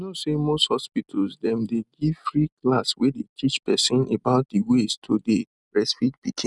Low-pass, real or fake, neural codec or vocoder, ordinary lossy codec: 10.8 kHz; real; none; none